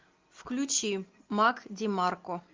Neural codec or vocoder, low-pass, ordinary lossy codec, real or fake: none; 7.2 kHz; Opus, 32 kbps; real